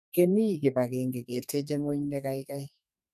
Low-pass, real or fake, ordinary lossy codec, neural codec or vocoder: 14.4 kHz; fake; none; codec, 32 kHz, 1.9 kbps, SNAC